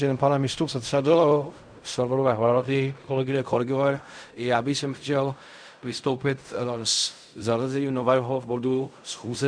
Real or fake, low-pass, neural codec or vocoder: fake; 9.9 kHz; codec, 16 kHz in and 24 kHz out, 0.4 kbps, LongCat-Audio-Codec, fine tuned four codebook decoder